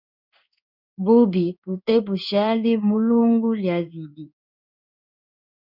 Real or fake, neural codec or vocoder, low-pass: fake; codec, 16 kHz in and 24 kHz out, 1 kbps, XY-Tokenizer; 5.4 kHz